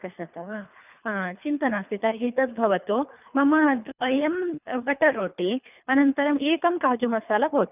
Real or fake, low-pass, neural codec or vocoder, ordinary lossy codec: fake; 3.6 kHz; codec, 24 kHz, 3 kbps, HILCodec; none